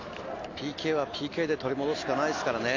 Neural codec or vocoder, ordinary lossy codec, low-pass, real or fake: none; AAC, 48 kbps; 7.2 kHz; real